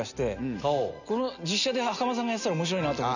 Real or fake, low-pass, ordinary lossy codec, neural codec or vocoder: real; 7.2 kHz; none; none